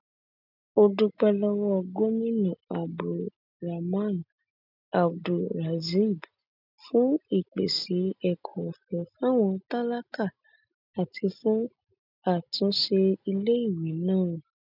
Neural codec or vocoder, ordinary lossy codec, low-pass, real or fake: none; none; 5.4 kHz; real